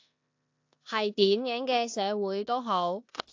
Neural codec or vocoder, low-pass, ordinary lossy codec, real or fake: codec, 16 kHz in and 24 kHz out, 0.9 kbps, LongCat-Audio-Codec, four codebook decoder; 7.2 kHz; AAC, 48 kbps; fake